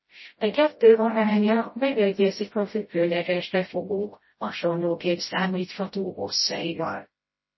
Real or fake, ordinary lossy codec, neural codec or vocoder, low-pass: fake; MP3, 24 kbps; codec, 16 kHz, 0.5 kbps, FreqCodec, smaller model; 7.2 kHz